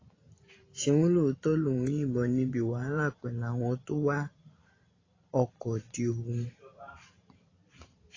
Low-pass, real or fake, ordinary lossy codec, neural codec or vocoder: 7.2 kHz; fake; AAC, 32 kbps; vocoder, 44.1 kHz, 128 mel bands every 512 samples, BigVGAN v2